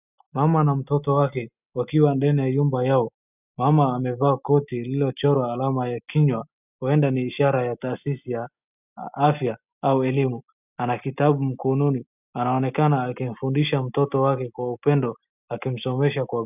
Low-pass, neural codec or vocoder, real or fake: 3.6 kHz; none; real